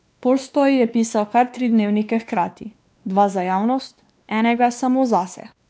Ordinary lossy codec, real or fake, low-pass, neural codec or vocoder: none; fake; none; codec, 16 kHz, 2 kbps, X-Codec, WavLM features, trained on Multilingual LibriSpeech